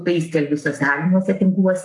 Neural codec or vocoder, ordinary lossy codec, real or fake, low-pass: codec, 44.1 kHz, 7.8 kbps, Pupu-Codec; AAC, 64 kbps; fake; 10.8 kHz